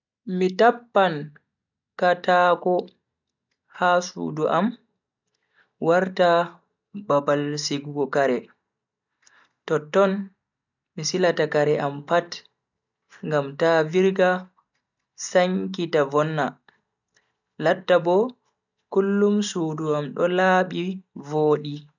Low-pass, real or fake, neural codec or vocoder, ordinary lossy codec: 7.2 kHz; real; none; none